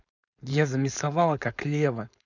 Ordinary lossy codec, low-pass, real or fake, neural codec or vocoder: none; 7.2 kHz; fake; codec, 16 kHz, 4.8 kbps, FACodec